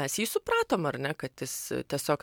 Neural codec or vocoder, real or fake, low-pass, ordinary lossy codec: vocoder, 44.1 kHz, 128 mel bands every 256 samples, BigVGAN v2; fake; 19.8 kHz; MP3, 96 kbps